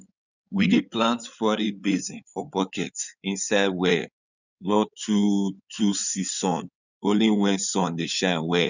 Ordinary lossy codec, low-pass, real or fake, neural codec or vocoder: none; 7.2 kHz; fake; codec, 16 kHz in and 24 kHz out, 2.2 kbps, FireRedTTS-2 codec